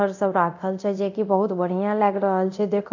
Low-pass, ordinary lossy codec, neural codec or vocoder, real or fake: 7.2 kHz; none; codec, 24 kHz, 0.9 kbps, DualCodec; fake